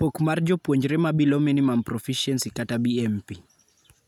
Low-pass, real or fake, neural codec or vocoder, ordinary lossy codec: 19.8 kHz; real; none; none